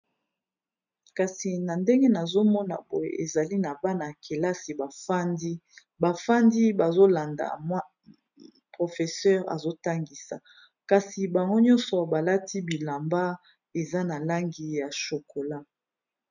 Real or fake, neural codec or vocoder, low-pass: real; none; 7.2 kHz